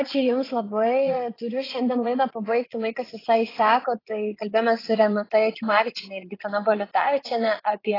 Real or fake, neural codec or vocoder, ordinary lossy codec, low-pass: fake; vocoder, 22.05 kHz, 80 mel bands, Vocos; AAC, 24 kbps; 5.4 kHz